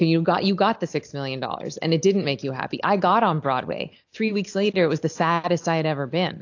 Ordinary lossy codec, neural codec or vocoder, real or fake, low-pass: AAC, 48 kbps; none; real; 7.2 kHz